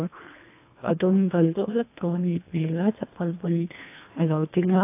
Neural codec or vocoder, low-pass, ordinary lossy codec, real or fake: codec, 24 kHz, 1.5 kbps, HILCodec; 3.6 kHz; AAC, 24 kbps; fake